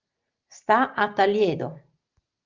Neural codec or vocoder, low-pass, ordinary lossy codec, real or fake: none; 7.2 kHz; Opus, 24 kbps; real